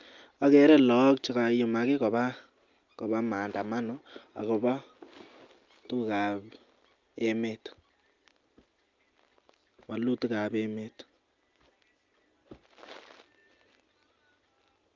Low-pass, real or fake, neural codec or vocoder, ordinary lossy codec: 7.2 kHz; real; none; Opus, 24 kbps